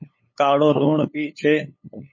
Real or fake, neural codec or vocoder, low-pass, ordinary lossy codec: fake; codec, 16 kHz, 8 kbps, FunCodec, trained on LibriTTS, 25 frames a second; 7.2 kHz; MP3, 32 kbps